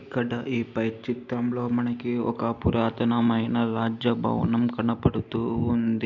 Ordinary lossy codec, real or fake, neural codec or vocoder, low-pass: none; real; none; 7.2 kHz